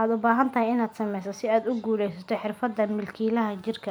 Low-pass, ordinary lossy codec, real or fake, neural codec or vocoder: none; none; real; none